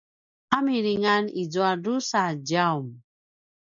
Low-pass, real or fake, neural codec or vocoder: 7.2 kHz; real; none